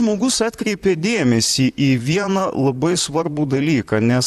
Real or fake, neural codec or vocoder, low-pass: fake; vocoder, 44.1 kHz, 128 mel bands, Pupu-Vocoder; 14.4 kHz